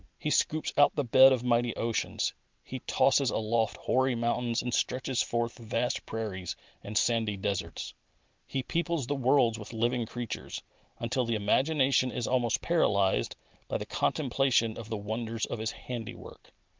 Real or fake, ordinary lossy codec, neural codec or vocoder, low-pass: real; Opus, 32 kbps; none; 7.2 kHz